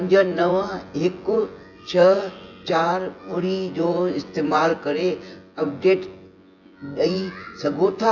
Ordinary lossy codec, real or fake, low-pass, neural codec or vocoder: AAC, 48 kbps; fake; 7.2 kHz; vocoder, 24 kHz, 100 mel bands, Vocos